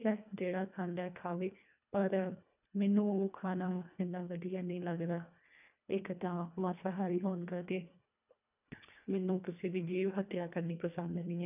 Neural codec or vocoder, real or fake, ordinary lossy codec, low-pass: codec, 24 kHz, 1.5 kbps, HILCodec; fake; none; 3.6 kHz